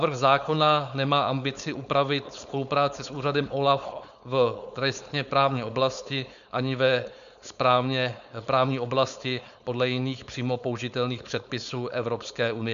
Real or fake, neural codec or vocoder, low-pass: fake; codec, 16 kHz, 4.8 kbps, FACodec; 7.2 kHz